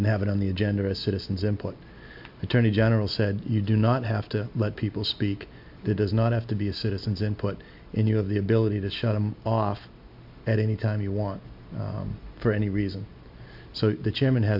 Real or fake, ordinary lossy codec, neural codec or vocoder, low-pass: real; MP3, 48 kbps; none; 5.4 kHz